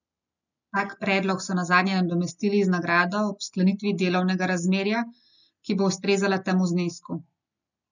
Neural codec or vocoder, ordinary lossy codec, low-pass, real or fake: none; none; 7.2 kHz; real